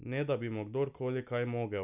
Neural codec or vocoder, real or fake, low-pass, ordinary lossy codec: none; real; 3.6 kHz; none